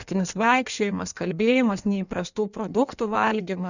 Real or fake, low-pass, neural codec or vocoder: fake; 7.2 kHz; codec, 16 kHz in and 24 kHz out, 1.1 kbps, FireRedTTS-2 codec